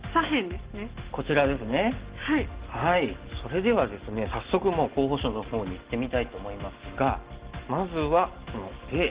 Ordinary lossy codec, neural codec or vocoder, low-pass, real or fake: Opus, 16 kbps; none; 3.6 kHz; real